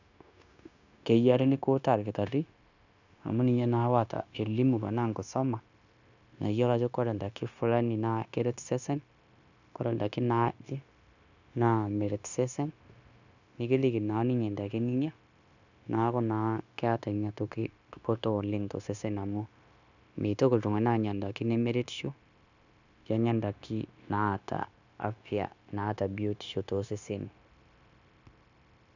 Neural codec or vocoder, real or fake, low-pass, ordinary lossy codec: codec, 16 kHz, 0.9 kbps, LongCat-Audio-Codec; fake; 7.2 kHz; none